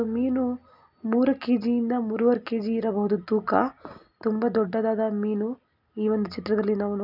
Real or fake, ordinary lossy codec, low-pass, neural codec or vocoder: real; none; 5.4 kHz; none